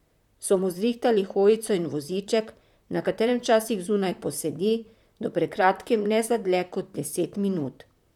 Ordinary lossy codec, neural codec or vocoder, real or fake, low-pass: none; vocoder, 44.1 kHz, 128 mel bands, Pupu-Vocoder; fake; 19.8 kHz